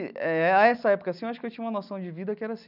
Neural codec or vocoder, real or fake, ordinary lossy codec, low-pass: none; real; none; 5.4 kHz